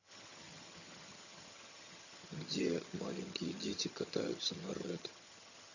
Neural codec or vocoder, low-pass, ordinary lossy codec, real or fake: vocoder, 22.05 kHz, 80 mel bands, HiFi-GAN; 7.2 kHz; none; fake